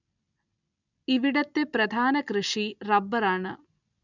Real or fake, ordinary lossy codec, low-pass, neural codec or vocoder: real; none; 7.2 kHz; none